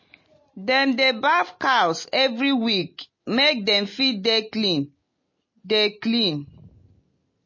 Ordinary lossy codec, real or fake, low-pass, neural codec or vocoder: MP3, 32 kbps; real; 7.2 kHz; none